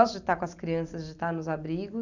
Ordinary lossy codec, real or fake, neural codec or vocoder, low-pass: none; real; none; 7.2 kHz